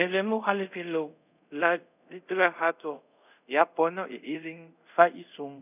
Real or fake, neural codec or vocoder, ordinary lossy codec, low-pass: fake; codec, 24 kHz, 0.5 kbps, DualCodec; none; 3.6 kHz